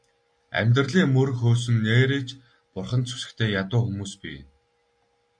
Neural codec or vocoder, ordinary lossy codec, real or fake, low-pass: none; AAC, 64 kbps; real; 9.9 kHz